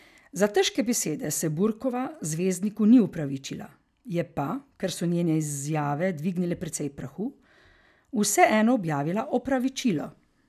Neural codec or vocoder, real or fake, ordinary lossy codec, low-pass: none; real; none; 14.4 kHz